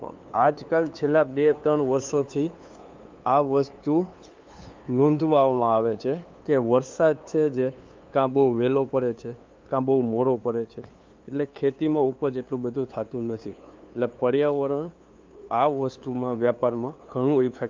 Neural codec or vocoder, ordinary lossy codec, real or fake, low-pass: codec, 16 kHz, 2 kbps, FunCodec, trained on LibriTTS, 25 frames a second; Opus, 24 kbps; fake; 7.2 kHz